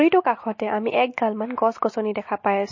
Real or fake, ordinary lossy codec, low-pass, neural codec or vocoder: real; MP3, 32 kbps; 7.2 kHz; none